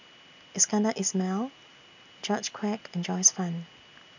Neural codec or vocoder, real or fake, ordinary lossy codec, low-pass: none; real; none; 7.2 kHz